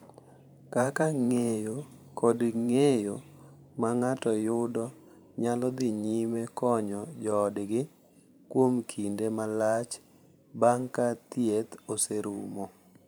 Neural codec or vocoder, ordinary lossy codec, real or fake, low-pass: vocoder, 44.1 kHz, 128 mel bands every 512 samples, BigVGAN v2; none; fake; none